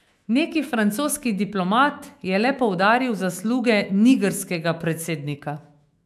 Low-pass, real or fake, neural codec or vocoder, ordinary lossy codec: 14.4 kHz; fake; autoencoder, 48 kHz, 128 numbers a frame, DAC-VAE, trained on Japanese speech; AAC, 96 kbps